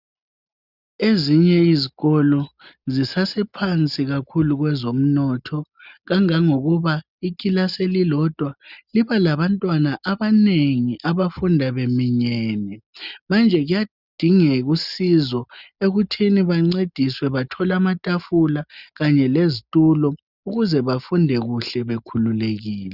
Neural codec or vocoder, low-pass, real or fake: none; 5.4 kHz; real